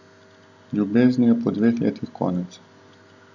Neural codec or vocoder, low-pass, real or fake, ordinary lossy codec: none; 7.2 kHz; real; none